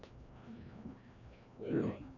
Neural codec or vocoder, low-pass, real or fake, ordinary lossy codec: codec, 16 kHz, 1 kbps, FreqCodec, larger model; 7.2 kHz; fake; none